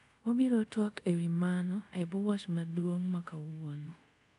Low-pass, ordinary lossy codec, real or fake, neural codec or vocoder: 10.8 kHz; none; fake; codec, 24 kHz, 0.5 kbps, DualCodec